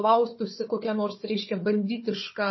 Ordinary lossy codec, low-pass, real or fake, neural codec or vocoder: MP3, 24 kbps; 7.2 kHz; fake; codec, 16 kHz, 4 kbps, FunCodec, trained on Chinese and English, 50 frames a second